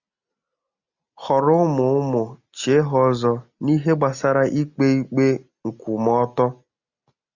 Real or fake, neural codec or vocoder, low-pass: real; none; 7.2 kHz